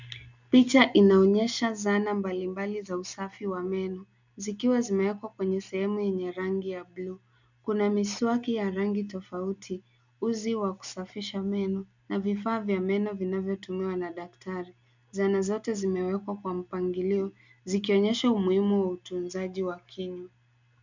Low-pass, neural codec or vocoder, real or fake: 7.2 kHz; none; real